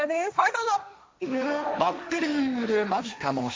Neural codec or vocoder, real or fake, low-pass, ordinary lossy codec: codec, 16 kHz, 1.1 kbps, Voila-Tokenizer; fake; none; none